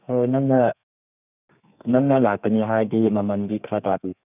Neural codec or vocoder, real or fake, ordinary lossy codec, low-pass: codec, 32 kHz, 1.9 kbps, SNAC; fake; none; 3.6 kHz